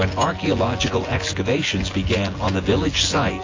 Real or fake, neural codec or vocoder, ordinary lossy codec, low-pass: fake; vocoder, 24 kHz, 100 mel bands, Vocos; AAC, 32 kbps; 7.2 kHz